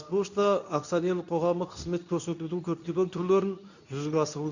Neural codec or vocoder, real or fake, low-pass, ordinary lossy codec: codec, 24 kHz, 0.9 kbps, WavTokenizer, medium speech release version 2; fake; 7.2 kHz; none